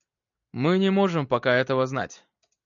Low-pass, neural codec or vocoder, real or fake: 7.2 kHz; none; real